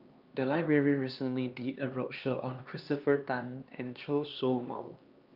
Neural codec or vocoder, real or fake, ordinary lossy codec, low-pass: codec, 16 kHz, 2 kbps, X-Codec, HuBERT features, trained on LibriSpeech; fake; Opus, 24 kbps; 5.4 kHz